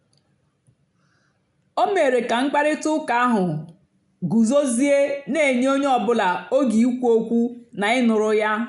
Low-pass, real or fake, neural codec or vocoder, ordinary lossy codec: 10.8 kHz; real; none; none